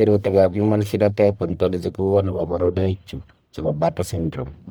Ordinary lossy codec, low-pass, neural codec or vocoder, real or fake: none; none; codec, 44.1 kHz, 1.7 kbps, Pupu-Codec; fake